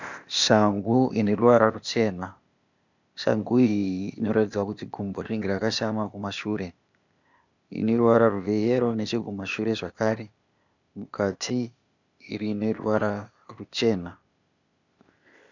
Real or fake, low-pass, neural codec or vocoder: fake; 7.2 kHz; codec, 16 kHz, 0.8 kbps, ZipCodec